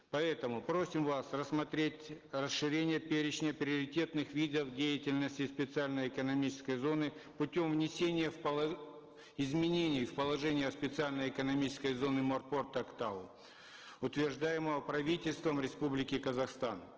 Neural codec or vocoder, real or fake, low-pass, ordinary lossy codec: none; real; 7.2 kHz; Opus, 16 kbps